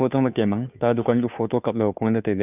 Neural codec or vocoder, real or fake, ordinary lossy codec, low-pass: codec, 16 kHz, 2 kbps, FunCodec, trained on Chinese and English, 25 frames a second; fake; none; 3.6 kHz